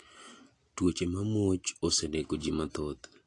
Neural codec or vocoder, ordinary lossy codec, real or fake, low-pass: none; AAC, 48 kbps; real; 10.8 kHz